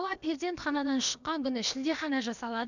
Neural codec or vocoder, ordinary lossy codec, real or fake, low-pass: codec, 16 kHz, about 1 kbps, DyCAST, with the encoder's durations; none; fake; 7.2 kHz